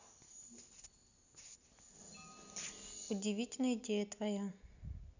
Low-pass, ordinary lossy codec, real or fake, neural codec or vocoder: 7.2 kHz; none; real; none